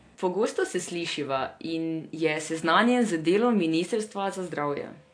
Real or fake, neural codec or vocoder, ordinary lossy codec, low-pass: real; none; AAC, 48 kbps; 9.9 kHz